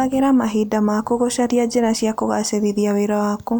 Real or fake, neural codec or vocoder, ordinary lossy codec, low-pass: real; none; none; none